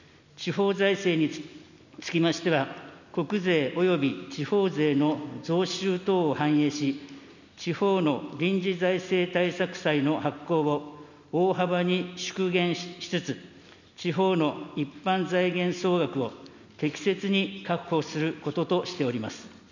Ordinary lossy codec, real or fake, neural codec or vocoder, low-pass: none; real; none; 7.2 kHz